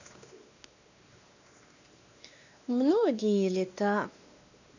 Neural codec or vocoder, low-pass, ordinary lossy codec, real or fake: codec, 16 kHz, 2 kbps, X-Codec, WavLM features, trained on Multilingual LibriSpeech; 7.2 kHz; none; fake